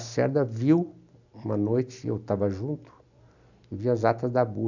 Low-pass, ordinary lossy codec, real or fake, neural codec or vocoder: 7.2 kHz; none; real; none